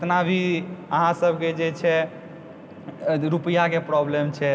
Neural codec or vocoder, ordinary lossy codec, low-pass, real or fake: none; none; none; real